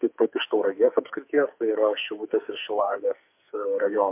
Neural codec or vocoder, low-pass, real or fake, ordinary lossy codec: codec, 44.1 kHz, 2.6 kbps, SNAC; 3.6 kHz; fake; MP3, 32 kbps